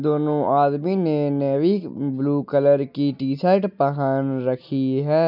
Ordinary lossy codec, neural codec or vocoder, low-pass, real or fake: none; none; 5.4 kHz; real